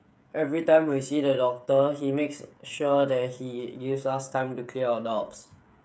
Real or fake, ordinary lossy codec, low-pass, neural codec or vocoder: fake; none; none; codec, 16 kHz, 16 kbps, FreqCodec, smaller model